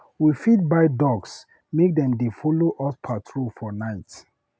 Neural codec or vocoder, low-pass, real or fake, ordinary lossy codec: none; none; real; none